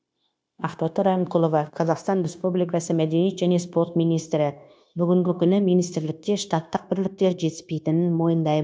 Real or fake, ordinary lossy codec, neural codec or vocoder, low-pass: fake; none; codec, 16 kHz, 0.9 kbps, LongCat-Audio-Codec; none